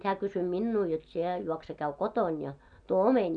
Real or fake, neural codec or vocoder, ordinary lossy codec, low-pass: real; none; none; 9.9 kHz